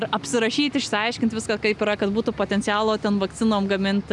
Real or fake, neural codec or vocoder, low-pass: real; none; 10.8 kHz